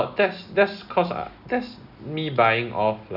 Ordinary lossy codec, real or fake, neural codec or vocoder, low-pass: none; real; none; 5.4 kHz